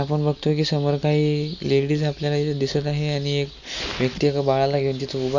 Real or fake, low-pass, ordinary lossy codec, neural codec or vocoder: real; 7.2 kHz; none; none